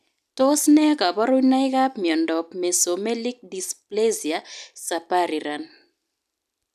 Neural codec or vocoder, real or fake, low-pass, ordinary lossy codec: none; real; 14.4 kHz; none